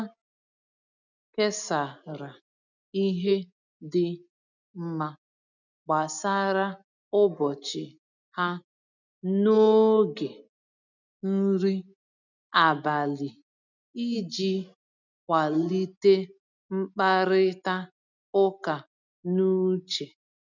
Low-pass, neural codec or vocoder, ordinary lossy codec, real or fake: 7.2 kHz; none; none; real